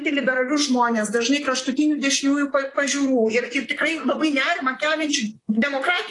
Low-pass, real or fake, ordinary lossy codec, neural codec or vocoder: 10.8 kHz; fake; AAC, 32 kbps; vocoder, 44.1 kHz, 128 mel bands, Pupu-Vocoder